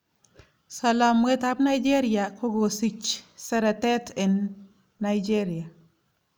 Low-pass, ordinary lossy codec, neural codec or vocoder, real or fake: none; none; none; real